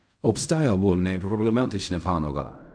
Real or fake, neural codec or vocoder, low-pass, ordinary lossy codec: fake; codec, 16 kHz in and 24 kHz out, 0.4 kbps, LongCat-Audio-Codec, fine tuned four codebook decoder; 9.9 kHz; none